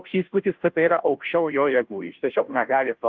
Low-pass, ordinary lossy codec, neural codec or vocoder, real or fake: 7.2 kHz; Opus, 24 kbps; codec, 16 kHz, 0.5 kbps, FunCodec, trained on Chinese and English, 25 frames a second; fake